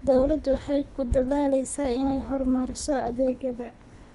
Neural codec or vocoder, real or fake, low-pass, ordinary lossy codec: codec, 24 kHz, 3 kbps, HILCodec; fake; 10.8 kHz; none